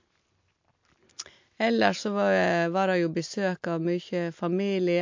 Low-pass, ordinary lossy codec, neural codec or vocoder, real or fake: 7.2 kHz; MP3, 48 kbps; none; real